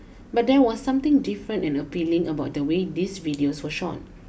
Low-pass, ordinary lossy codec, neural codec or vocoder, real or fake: none; none; none; real